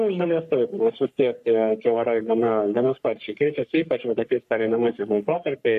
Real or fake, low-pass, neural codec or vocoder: fake; 14.4 kHz; codec, 44.1 kHz, 3.4 kbps, Pupu-Codec